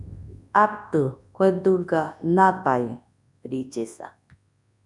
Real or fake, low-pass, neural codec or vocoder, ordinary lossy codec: fake; 10.8 kHz; codec, 24 kHz, 0.9 kbps, WavTokenizer, large speech release; MP3, 96 kbps